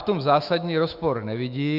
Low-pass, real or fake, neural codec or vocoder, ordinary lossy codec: 5.4 kHz; fake; autoencoder, 48 kHz, 128 numbers a frame, DAC-VAE, trained on Japanese speech; Opus, 64 kbps